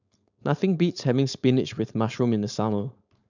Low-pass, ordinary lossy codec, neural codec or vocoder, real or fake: 7.2 kHz; none; codec, 16 kHz, 4.8 kbps, FACodec; fake